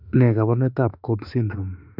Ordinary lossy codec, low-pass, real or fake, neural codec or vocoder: none; 5.4 kHz; fake; autoencoder, 48 kHz, 32 numbers a frame, DAC-VAE, trained on Japanese speech